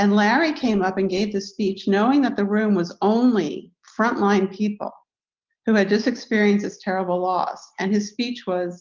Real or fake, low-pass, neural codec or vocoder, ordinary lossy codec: real; 7.2 kHz; none; Opus, 24 kbps